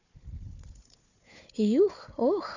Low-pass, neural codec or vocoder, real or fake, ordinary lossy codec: 7.2 kHz; codec, 16 kHz, 4 kbps, FunCodec, trained on Chinese and English, 50 frames a second; fake; none